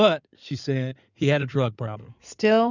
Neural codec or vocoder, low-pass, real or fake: codec, 16 kHz in and 24 kHz out, 2.2 kbps, FireRedTTS-2 codec; 7.2 kHz; fake